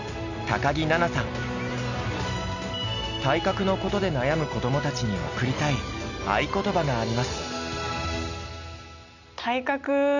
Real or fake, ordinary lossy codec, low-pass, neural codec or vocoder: real; none; 7.2 kHz; none